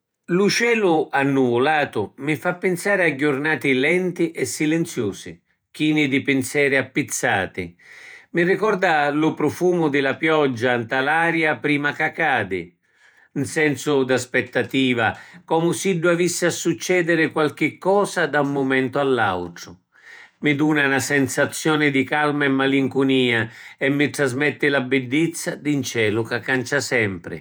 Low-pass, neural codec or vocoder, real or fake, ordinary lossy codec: none; vocoder, 48 kHz, 128 mel bands, Vocos; fake; none